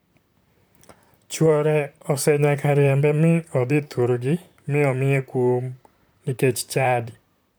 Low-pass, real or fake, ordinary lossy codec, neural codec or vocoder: none; fake; none; vocoder, 44.1 kHz, 128 mel bands, Pupu-Vocoder